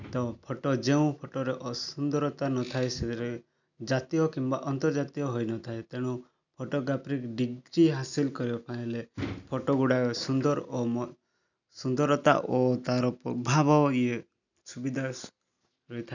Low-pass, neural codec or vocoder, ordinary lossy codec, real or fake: 7.2 kHz; none; none; real